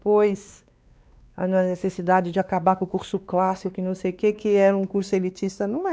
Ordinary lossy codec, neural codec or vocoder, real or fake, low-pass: none; codec, 16 kHz, 2 kbps, X-Codec, WavLM features, trained on Multilingual LibriSpeech; fake; none